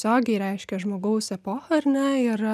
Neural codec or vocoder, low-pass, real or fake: none; 14.4 kHz; real